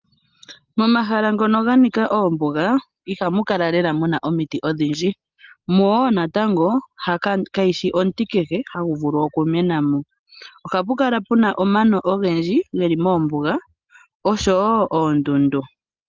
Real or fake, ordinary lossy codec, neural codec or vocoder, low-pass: real; Opus, 32 kbps; none; 7.2 kHz